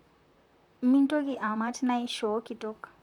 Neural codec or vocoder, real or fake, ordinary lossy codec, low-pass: vocoder, 44.1 kHz, 128 mel bands, Pupu-Vocoder; fake; none; 19.8 kHz